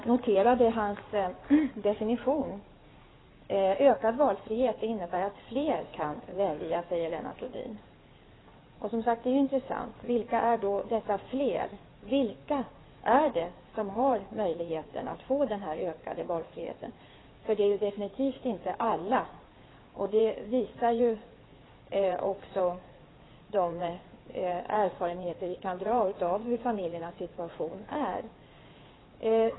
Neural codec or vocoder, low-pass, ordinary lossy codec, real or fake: codec, 16 kHz in and 24 kHz out, 2.2 kbps, FireRedTTS-2 codec; 7.2 kHz; AAC, 16 kbps; fake